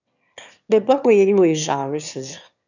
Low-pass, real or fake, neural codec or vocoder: 7.2 kHz; fake; autoencoder, 22.05 kHz, a latent of 192 numbers a frame, VITS, trained on one speaker